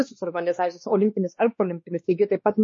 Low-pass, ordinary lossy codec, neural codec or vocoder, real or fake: 7.2 kHz; MP3, 32 kbps; codec, 16 kHz, 2 kbps, X-Codec, WavLM features, trained on Multilingual LibriSpeech; fake